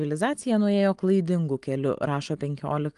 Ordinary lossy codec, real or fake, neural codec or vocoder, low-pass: Opus, 24 kbps; real; none; 10.8 kHz